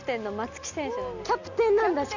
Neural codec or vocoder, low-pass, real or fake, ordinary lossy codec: none; 7.2 kHz; real; none